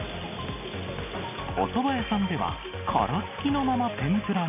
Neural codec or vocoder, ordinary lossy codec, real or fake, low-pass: none; none; real; 3.6 kHz